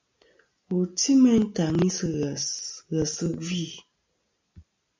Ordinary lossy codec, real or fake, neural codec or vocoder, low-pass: MP3, 64 kbps; real; none; 7.2 kHz